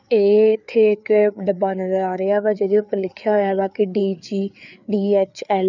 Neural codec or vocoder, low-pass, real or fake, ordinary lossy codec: codec, 16 kHz, 4 kbps, FreqCodec, larger model; 7.2 kHz; fake; none